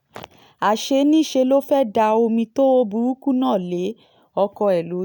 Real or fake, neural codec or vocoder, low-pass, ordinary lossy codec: real; none; 19.8 kHz; none